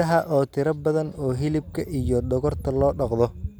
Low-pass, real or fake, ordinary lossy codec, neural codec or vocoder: none; real; none; none